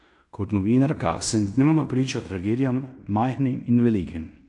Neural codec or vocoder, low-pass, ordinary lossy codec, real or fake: codec, 16 kHz in and 24 kHz out, 0.9 kbps, LongCat-Audio-Codec, fine tuned four codebook decoder; 10.8 kHz; AAC, 64 kbps; fake